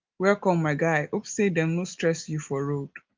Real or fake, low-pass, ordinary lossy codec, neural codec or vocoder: real; 7.2 kHz; Opus, 24 kbps; none